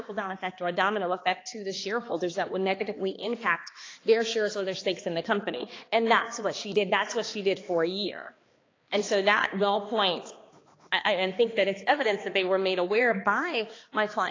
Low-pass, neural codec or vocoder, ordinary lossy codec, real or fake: 7.2 kHz; codec, 16 kHz, 2 kbps, X-Codec, HuBERT features, trained on balanced general audio; AAC, 32 kbps; fake